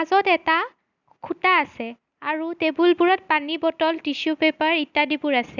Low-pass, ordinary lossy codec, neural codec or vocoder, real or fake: 7.2 kHz; none; none; real